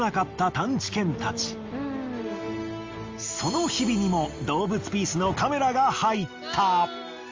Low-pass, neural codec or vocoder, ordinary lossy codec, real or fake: 7.2 kHz; none; Opus, 32 kbps; real